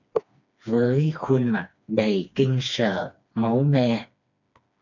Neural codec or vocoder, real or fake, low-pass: codec, 16 kHz, 2 kbps, FreqCodec, smaller model; fake; 7.2 kHz